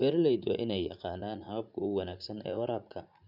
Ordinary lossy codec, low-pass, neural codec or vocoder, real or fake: none; 5.4 kHz; vocoder, 44.1 kHz, 80 mel bands, Vocos; fake